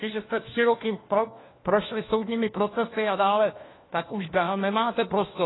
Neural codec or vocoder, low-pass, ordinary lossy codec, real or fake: codec, 16 kHz, 1 kbps, FunCodec, trained on LibriTTS, 50 frames a second; 7.2 kHz; AAC, 16 kbps; fake